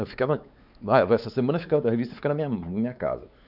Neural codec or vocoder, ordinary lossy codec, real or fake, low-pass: codec, 16 kHz, 4 kbps, X-Codec, WavLM features, trained on Multilingual LibriSpeech; none; fake; 5.4 kHz